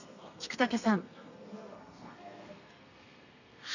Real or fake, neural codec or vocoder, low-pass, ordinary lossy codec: fake; codec, 32 kHz, 1.9 kbps, SNAC; 7.2 kHz; none